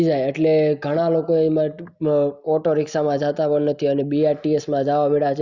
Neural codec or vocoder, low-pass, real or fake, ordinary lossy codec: none; 7.2 kHz; real; Opus, 64 kbps